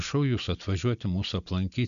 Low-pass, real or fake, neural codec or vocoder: 7.2 kHz; real; none